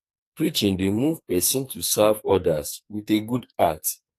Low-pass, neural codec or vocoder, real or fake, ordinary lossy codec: 14.4 kHz; codec, 44.1 kHz, 2.6 kbps, SNAC; fake; AAC, 64 kbps